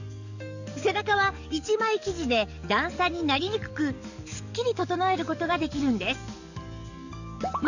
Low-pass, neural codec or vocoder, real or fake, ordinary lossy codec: 7.2 kHz; codec, 44.1 kHz, 7.8 kbps, DAC; fake; none